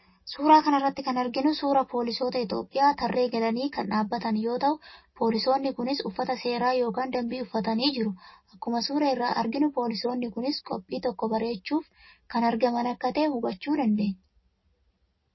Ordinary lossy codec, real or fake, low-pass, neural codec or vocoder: MP3, 24 kbps; real; 7.2 kHz; none